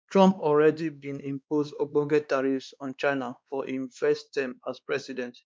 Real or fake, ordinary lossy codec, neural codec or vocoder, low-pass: fake; none; codec, 16 kHz, 2 kbps, X-Codec, WavLM features, trained on Multilingual LibriSpeech; none